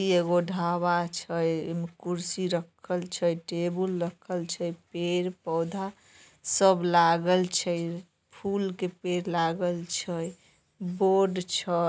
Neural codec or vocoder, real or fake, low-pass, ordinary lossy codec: none; real; none; none